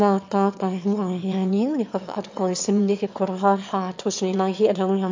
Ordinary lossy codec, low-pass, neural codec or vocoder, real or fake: MP3, 48 kbps; 7.2 kHz; autoencoder, 22.05 kHz, a latent of 192 numbers a frame, VITS, trained on one speaker; fake